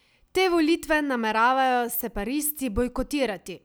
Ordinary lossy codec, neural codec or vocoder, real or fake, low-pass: none; none; real; none